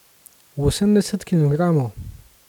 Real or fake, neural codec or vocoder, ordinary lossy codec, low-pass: real; none; none; 19.8 kHz